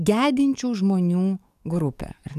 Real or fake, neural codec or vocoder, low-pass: fake; codec, 44.1 kHz, 7.8 kbps, DAC; 14.4 kHz